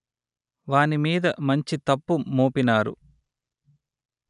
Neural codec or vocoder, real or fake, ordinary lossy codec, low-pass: none; real; none; 10.8 kHz